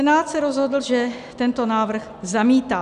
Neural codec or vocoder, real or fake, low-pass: none; real; 10.8 kHz